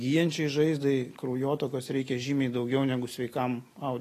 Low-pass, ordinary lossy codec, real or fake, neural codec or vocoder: 14.4 kHz; AAC, 48 kbps; real; none